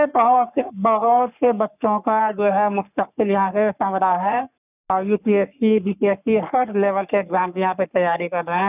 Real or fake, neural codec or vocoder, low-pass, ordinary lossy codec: fake; codec, 44.1 kHz, 3.4 kbps, Pupu-Codec; 3.6 kHz; none